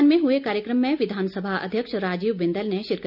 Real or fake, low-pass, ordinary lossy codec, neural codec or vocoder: real; 5.4 kHz; none; none